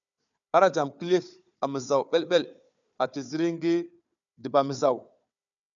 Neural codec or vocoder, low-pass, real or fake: codec, 16 kHz, 4 kbps, FunCodec, trained on Chinese and English, 50 frames a second; 7.2 kHz; fake